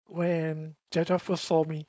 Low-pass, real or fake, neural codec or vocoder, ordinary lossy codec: none; fake; codec, 16 kHz, 4.8 kbps, FACodec; none